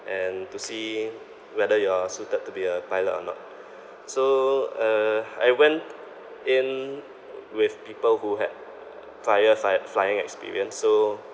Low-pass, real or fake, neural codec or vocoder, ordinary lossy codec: none; real; none; none